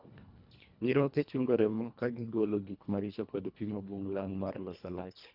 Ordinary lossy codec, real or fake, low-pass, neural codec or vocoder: none; fake; 5.4 kHz; codec, 24 kHz, 1.5 kbps, HILCodec